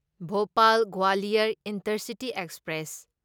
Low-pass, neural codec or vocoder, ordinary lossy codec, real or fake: none; none; none; real